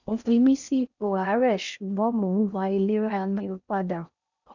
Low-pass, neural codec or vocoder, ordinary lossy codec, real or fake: 7.2 kHz; codec, 16 kHz in and 24 kHz out, 0.6 kbps, FocalCodec, streaming, 4096 codes; none; fake